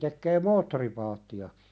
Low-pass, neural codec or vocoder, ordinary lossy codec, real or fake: none; none; none; real